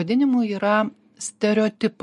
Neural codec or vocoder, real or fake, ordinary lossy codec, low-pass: none; real; MP3, 48 kbps; 14.4 kHz